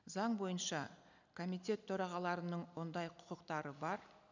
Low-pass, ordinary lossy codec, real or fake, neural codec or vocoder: 7.2 kHz; none; real; none